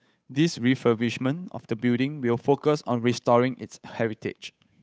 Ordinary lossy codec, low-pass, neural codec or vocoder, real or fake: none; none; codec, 16 kHz, 8 kbps, FunCodec, trained on Chinese and English, 25 frames a second; fake